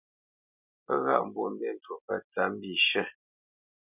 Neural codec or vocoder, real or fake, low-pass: none; real; 3.6 kHz